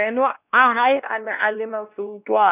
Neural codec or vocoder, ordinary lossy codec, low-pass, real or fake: codec, 16 kHz, 1 kbps, X-Codec, WavLM features, trained on Multilingual LibriSpeech; none; 3.6 kHz; fake